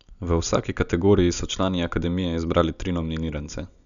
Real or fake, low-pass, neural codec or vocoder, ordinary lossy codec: real; 7.2 kHz; none; none